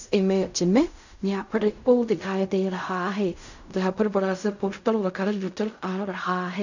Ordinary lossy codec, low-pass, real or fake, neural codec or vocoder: none; 7.2 kHz; fake; codec, 16 kHz in and 24 kHz out, 0.4 kbps, LongCat-Audio-Codec, fine tuned four codebook decoder